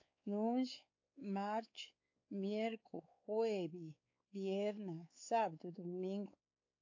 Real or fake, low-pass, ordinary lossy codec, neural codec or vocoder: fake; 7.2 kHz; AAC, 48 kbps; codec, 16 kHz, 4 kbps, X-Codec, WavLM features, trained on Multilingual LibriSpeech